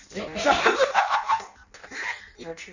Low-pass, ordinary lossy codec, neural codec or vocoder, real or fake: 7.2 kHz; none; codec, 16 kHz in and 24 kHz out, 0.6 kbps, FireRedTTS-2 codec; fake